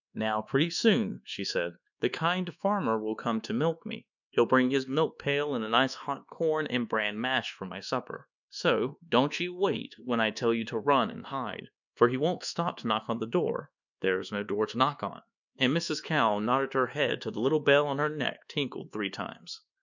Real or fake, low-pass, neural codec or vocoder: fake; 7.2 kHz; codec, 24 kHz, 1.2 kbps, DualCodec